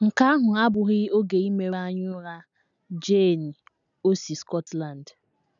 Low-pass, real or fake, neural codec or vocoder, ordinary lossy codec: 7.2 kHz; real; none; none